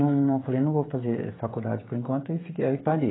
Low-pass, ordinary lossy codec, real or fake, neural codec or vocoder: 7.2 kHz; AAC, 16 kbps; fake; codec, 16 kHz, 16 kbps, FreqCodec, smaller model